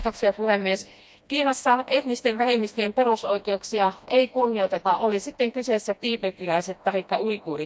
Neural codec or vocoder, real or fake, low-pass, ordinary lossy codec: codec, 16 kHz, 1 kbps, FreqCodec, smaller model; fake; none; none